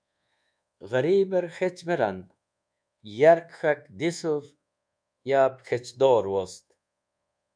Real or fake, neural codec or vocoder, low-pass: fake; codec, 24 kHz, 1.2 kbps, DualCodec; 9.9 kHz